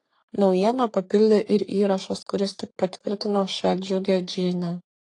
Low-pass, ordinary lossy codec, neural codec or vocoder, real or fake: 10.8 kHz; AAC, 48 kbps; codec, 44.1 kHz, 3.4 kbps, Pupu-Codec; fake